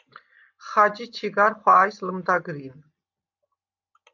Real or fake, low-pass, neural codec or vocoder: real; 7.2 kHz; none